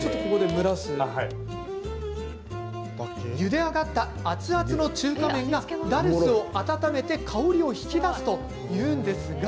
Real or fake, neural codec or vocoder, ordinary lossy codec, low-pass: real; none; none; none